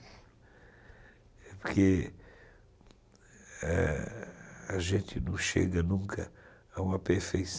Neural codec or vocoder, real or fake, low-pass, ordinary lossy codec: none; real; none; none